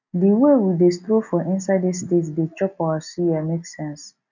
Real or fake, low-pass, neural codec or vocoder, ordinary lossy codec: real; 7.2 kHz; none; none